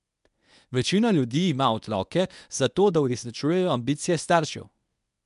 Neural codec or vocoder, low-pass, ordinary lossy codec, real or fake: codec, 24 kHz, 0.9 kbps, WavTokenizer, medium speech release version 1; 10.8 kHz; none; fake